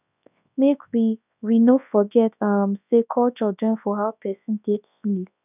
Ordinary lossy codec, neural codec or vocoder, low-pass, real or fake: none; codec, 24 kHz, 0.9 kbps, WavTokenizer, large speech release; 3.6 kHz; fake